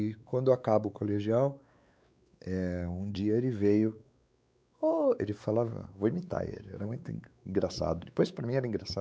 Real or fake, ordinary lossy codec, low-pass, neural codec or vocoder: fake; none; none; codec, 16 kHz, 4 kbps, X-Codec, WavLM features, trained on Multilingual LibriSpeech